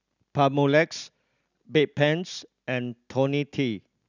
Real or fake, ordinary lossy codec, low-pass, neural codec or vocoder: real; none; 7.2 kHz; none